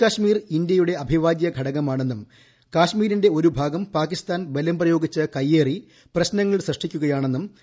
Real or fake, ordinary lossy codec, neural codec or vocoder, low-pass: real; none; none; none